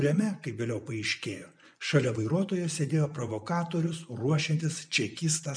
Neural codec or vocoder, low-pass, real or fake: vocoder, 24 kHz, 100 mel bands, Vocos; 9.9 kHz; fake